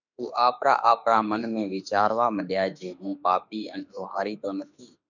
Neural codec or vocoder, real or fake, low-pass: autoencoder, 48 kHz, 32 numbers a frame, DAC-VAE, trained on Japanese speech; fake; 7.2 kHz